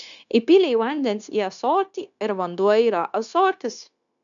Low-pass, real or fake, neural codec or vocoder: 7.2 kHz; fake; codec, 16 kHz, 0.9 kbps, LongCat-Audio-Codec